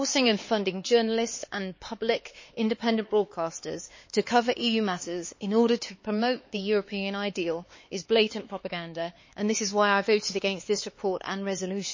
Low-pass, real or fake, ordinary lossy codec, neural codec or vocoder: 7.2 kHz; fake; MP3, 32 kbps; codec, 16 kHz, 4 kbps, X-Codec, HuBERT features, trained on LibriSpeech